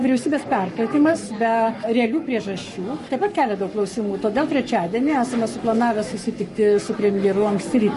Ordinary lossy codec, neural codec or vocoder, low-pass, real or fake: MP3, 48 kbps; codec, 44.1 kHz, 7.8 kbps, Pupu-Codec; 14.4 kHz; fake